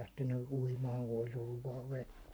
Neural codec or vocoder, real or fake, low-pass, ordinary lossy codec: codec, 44.1 kHz, 7.8 kbps, Pupu-Codec; fake; none; none